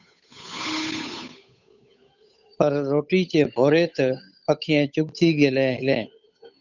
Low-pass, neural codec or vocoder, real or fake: 7.2 kHz; codec, 16 kHz, 8 kbps, FunCodec, trained on Chinese and English, 25 frames a second; fake